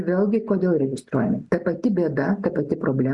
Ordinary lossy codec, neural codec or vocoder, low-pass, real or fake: Opus, 64 kbps; none; 10.8 kHz; real